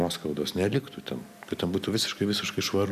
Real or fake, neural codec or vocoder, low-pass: real; none; 14.4 kHz